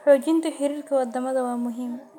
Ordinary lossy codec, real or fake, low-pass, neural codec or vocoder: none; real; 19.8 kHz; none